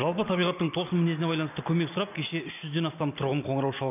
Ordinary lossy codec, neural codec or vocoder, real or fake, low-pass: none; none; real; 3.6 kHz